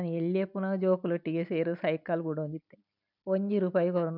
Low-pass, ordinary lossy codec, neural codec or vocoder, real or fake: 5.4 kHz; none; vocoder, 44.1 kHz, 128 mel bands every 256 samples, BigVGAN v2; fake